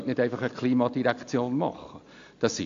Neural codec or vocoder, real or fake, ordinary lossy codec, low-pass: none; real; AAC, 48 kbps; 7.2 kHz